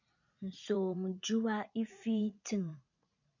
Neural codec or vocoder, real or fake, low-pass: vocoder, 44.1 kHz, 80 mel bands, Vocos; fake; 7.2 kHz